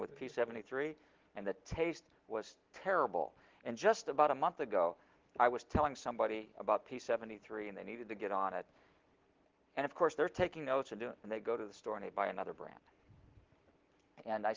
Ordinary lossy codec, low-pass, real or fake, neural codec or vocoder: Opus, 16 kbps; 7.2 kHz; real; none